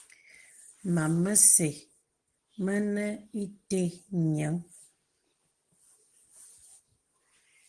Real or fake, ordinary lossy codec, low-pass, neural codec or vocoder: real; Opus, 16 kbps; 9.9 kHz; none